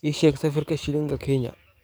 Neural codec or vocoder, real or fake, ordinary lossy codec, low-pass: codec, 44.1 kHz, 7.8 kbps, DAC; fake; none; none